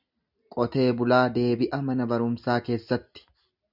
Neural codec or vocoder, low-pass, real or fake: none; 5.4 kHz; real